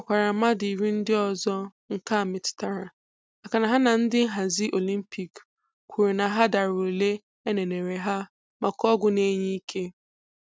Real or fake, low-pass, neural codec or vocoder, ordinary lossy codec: real; none; none; none